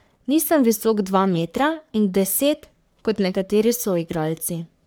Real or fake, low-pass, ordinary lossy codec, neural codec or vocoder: fake; none; none; codec, 44.1 kHz, 3.4 kbps, Pupu-Codec